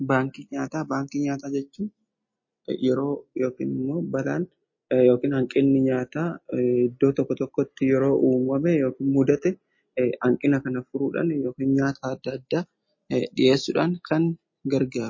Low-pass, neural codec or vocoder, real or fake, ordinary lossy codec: 7.2 kHz; none; real; MP3, 32 kbps